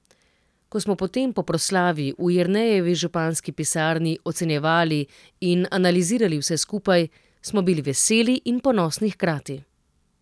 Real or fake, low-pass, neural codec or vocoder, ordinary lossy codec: real; none; none; none